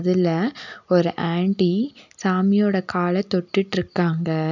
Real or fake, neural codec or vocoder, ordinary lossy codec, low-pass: real; none; none; 7.2 kHz